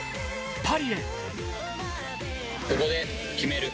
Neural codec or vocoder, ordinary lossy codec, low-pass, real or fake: none; none; none; real